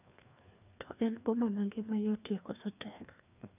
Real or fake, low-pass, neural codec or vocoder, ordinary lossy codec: fake; 3.6 kHz; codec, 16 kHz, 2 kbps, FreqCodec, larger model; none